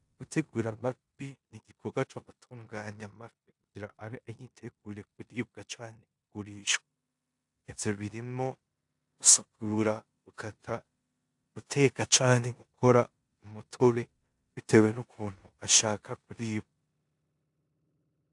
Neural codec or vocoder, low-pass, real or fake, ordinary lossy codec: codec, 16 kHz in and 24 kHz out, 0.9 kbps, LongCat-Audio-Codec, four codebook decoder; 10.8 kHz; fake; AAC, 64 kbps